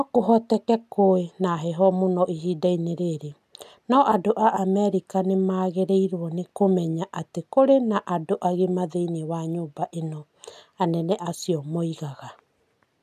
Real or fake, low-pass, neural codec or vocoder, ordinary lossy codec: real; 14.4 kHz; none; AAC, 96 kbps